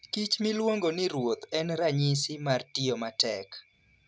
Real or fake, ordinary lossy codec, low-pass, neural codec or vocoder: real; none; none; none